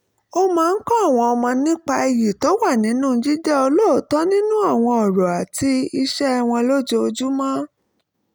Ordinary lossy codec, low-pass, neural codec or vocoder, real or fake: none; none; none; real